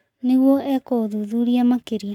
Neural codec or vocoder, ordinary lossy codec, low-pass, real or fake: codec, 44.1 kHz, 7.8 kbps, Pupu-Codec; none; 19.8 kHz; fake